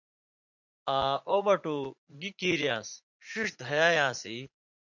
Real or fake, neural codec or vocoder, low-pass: fake; vocoder, 44.1 kHz, 80 mel bands, Vocos; 7.2 kHz